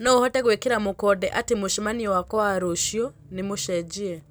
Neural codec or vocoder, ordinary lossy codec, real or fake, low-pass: none; none; real; none